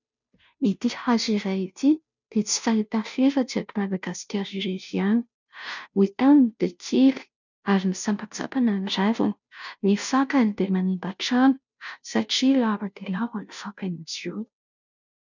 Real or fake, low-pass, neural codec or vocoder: fake; 7.2 kHz; codec, 16 kHz, 0.5 kbps, FunCodec, trained on Chinese and English, 25 frames a second